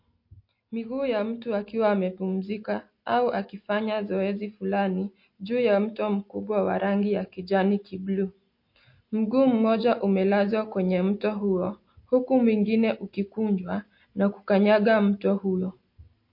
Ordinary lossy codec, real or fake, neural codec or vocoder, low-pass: MP3, 32 kbps; real; none; 5.4 kHz